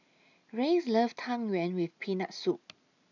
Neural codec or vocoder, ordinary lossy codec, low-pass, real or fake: none; none; 7.2 kHz; real